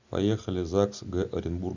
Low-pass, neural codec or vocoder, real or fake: 7.2 kHz; none; real